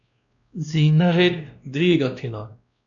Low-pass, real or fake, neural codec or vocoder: 7.2 kHz; fake; codec, 16 kHz, 1 kbps, X-Codec, WavLM features, trained on Multilingual LibriSpeech